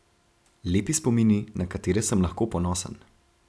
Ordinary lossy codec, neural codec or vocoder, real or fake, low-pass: none; none; real; none